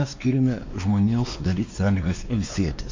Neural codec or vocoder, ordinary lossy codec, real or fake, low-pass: codec, 16 kHz, 2 kbps, X-Codec, WavLM features, trained on Multilingual LibriSpeech; MP3, 48 kbps; fake; 7.2 kHz